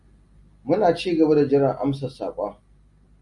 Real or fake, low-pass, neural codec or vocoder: real; 10.8 kHz; none